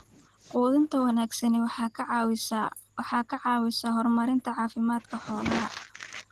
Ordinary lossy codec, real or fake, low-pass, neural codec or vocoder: Opus, 16 kbps; real; 14.4 kHz; none